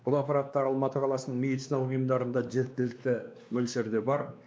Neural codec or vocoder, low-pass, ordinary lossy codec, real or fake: codec, 16 kHz, 2 kbps, X-Codec, WavLM features, trained on Multilingual LibriSpeech; none; none; fake